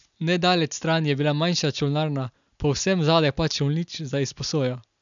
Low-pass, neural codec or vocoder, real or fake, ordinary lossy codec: 7.2 kHz; none; real; none